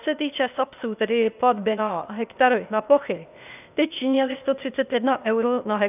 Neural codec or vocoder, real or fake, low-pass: codec, 16 kHz, 0.8 kbps, ZipCodec; fake; 3.6 kHz